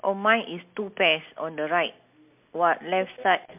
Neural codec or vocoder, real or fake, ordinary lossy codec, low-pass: none; real; MP3, 32 kbps; 3.6 kHz